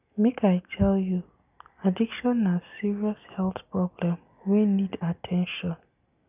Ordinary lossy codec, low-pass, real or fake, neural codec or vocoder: none; 3.6 kHz; real; none